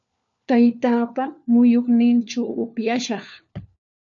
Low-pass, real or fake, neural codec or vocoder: 7.2 kHz; fake; codec, 16 kHz, 4 kbps, FunCodec, trained on LibriTTS, 50 frames a second